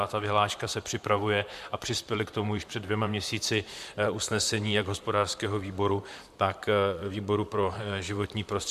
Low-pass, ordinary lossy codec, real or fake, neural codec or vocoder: 14.4 kHz; MP3, 96 kbps; fake; vocoder, 44.1 kHz, 128 mel bands, Pupu-Vocoder